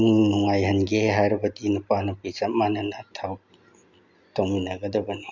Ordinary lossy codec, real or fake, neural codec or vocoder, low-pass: none; real; none; 7.2 kHz